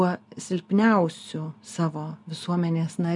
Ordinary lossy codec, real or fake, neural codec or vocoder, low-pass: MP3, 96 kbps; real; none; 10.8 kHz